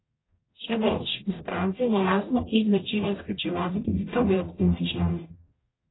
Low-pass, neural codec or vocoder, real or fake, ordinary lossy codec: 7.2 kHz; codec, 44.1 kHz, 0.9 kbps, DAC; fake; AAC, 16 kbps